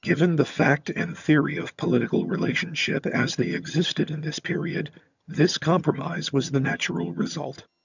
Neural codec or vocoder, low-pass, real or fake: vocoder, 22.05 kHz, 80 mel bands, HiFi-GAN; 7.2 kHz; fake